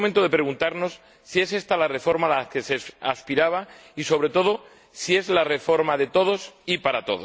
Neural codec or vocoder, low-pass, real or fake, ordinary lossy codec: none; none; real; none